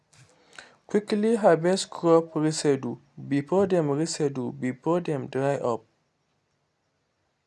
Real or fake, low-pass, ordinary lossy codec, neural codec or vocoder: real; none; none; none